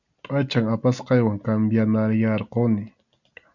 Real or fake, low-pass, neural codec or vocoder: real; 7.2 kHz; none